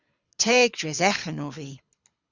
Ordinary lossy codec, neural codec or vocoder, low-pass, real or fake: Opus, 64 kbps; codec, 24 kHz, 6 kbps, HILCodec; 7.2 kHz; fake